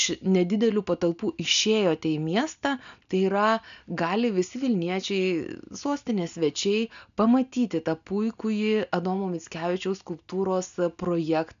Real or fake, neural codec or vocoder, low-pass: real; none; 7.2 kHz